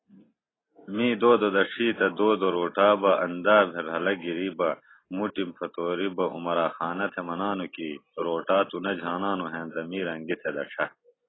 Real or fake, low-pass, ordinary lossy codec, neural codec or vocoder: real; 7.2 kHz; AAC, 16 kbps; none